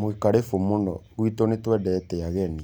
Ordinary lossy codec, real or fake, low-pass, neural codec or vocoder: none; real; none; none